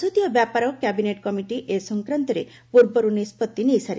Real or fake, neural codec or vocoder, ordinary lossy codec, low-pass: real; none; none; none